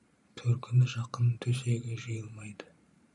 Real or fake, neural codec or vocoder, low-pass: fake; vocoder, 44.1 kHz, 128 mel bands every 512 samples, BigVGAN v2; 10.8 kHz